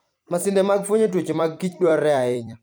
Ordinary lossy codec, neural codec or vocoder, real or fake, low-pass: none; vocoder, 44.1 kHz, 128 mel bands every 256 samples, BigVGAN v2; fake; none